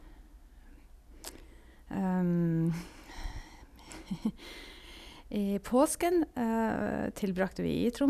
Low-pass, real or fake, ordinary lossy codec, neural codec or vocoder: 14.4 kHz; real; none; none